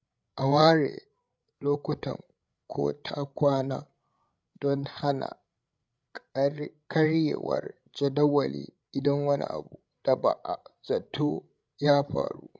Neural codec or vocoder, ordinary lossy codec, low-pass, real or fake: codec, 16 kHz, 16 kbps, FreqCodec, larger model; none; none; fake